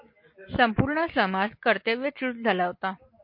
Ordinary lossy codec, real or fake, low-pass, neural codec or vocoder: MP3, 32 kbps; real; 5.4 kHz; none